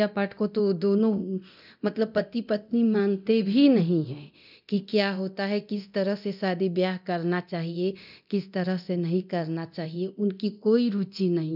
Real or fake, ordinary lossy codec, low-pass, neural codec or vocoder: fake; none; 5.4 kHz; codec, 24 kHz, 0.9 kbps, DualCodec